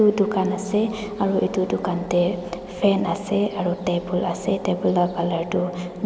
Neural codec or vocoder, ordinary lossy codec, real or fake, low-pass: none; none; real; none